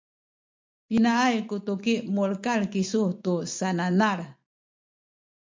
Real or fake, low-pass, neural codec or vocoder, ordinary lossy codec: real; 7.2 kHz; none; MP3, 64 kbps